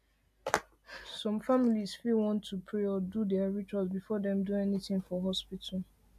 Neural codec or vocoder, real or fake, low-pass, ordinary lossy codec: none; real; 14.4 kHz; AAC, 96 kbps